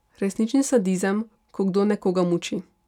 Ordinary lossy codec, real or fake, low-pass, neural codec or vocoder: none; real; 19.8 kHz; none